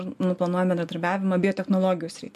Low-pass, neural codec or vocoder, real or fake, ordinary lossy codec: 14.4 kHz; none; real; MP3, 64 kbps